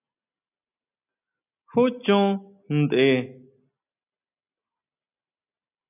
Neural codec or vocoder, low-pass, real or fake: none; 3.6 kHz; real